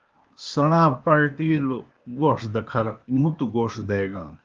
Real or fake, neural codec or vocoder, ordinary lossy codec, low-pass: fake; codec, 16 kHz, 0.8 kbps, ZipCodec; Opus, 32 kbps; 7.2 kHz